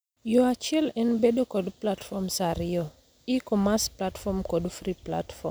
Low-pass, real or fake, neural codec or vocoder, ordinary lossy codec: none; real; none; none